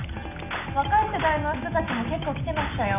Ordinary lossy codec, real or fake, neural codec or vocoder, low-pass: none; real; none; 3.6 kHz